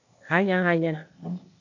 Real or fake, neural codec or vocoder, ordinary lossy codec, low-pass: fake; codec, 16 kHz, 0.8 kbps, ZipCodec; AAC, 48 kbps; 7.2 kHz